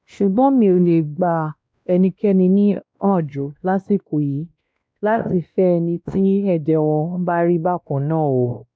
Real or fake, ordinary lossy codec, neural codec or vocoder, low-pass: fake; none; codec, 16 kHz, 1 kbps, X-Codec, WavLM features, trained on Multilingual LibriSpeech; none